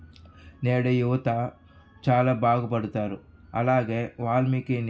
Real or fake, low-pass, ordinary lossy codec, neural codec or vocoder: real; none; none; none